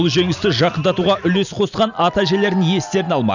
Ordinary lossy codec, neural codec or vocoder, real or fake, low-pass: none; none; real; 7.2 kHz